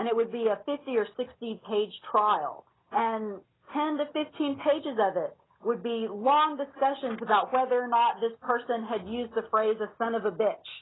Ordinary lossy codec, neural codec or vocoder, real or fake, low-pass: AAC, 16 kbps; none; real; 7.2 kHz